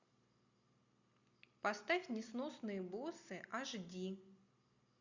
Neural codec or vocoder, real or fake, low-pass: none; real; 7.2 kHz